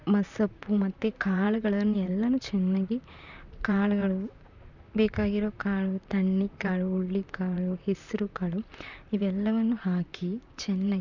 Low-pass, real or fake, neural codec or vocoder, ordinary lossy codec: 7.2 kHz; fake; vocoder, 44.1 kHz, 128 mel bands, Pupu-Vocoder; none